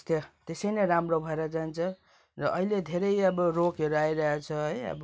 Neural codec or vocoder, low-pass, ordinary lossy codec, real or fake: none; none; none; real